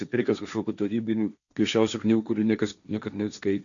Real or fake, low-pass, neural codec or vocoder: fake; 7.2 kHz; codec, 16 kHz, 1.1 kbps, Voila-Tokenizer